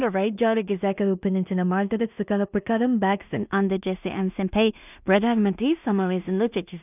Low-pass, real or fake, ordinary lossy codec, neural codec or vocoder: 3.6 kHz; fake; none; codec, 16 kHz in and 24 kHz out, 0.4 kbps, LongCat-Audio-Codec, two codebook decoder